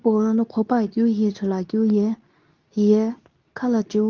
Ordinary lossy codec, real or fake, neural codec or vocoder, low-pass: Opus, 32 kbps; fake; codec, 24 kHz, 0.9 kbps, WavTokenizer, medium speech release version 2; 7.2 kHz